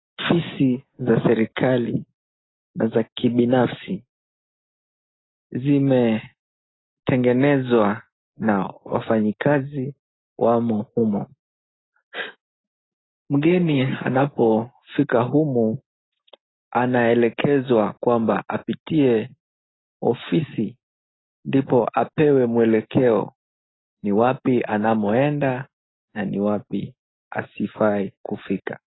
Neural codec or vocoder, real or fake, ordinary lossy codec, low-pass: none; real; AAC, 16 kbps; 7.2 kHz